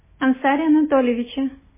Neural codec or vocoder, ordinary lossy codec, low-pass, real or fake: none; MP3, 16 kbps; 3.6 kHz; real